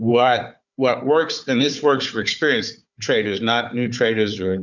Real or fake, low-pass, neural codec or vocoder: fake; 7.2 kHz; codec, 16 kHz, 4 kbps, FunCodec, trained on Chinese and English, 50 frames a second